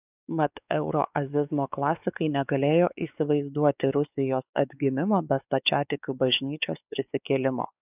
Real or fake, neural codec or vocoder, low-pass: fake; codec, 16 kHz, 4 kbps, X-Codec, WavLM features, trained on Multilingual LibriSpeech; 3.6 kHz